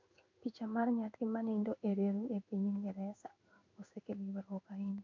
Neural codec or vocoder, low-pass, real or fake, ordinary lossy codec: codec, 16 kHz in and 24 kHz out, 1 kbps, XY-Tokenizer; 7.2 kHz; fake; MP3, 64 kbps